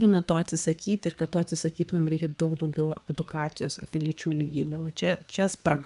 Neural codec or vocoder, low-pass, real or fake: codec, 24 kHz, 1 kbps, SNAC; 10.8 kHz; fake